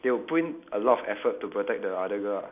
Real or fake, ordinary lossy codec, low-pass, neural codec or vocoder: real; none; 3.6 kHz; none